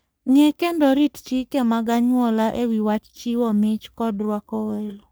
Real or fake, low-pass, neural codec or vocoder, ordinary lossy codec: fake; none; codec, 44.1 kHz, 3.4 kbps, Pupu-Codec; none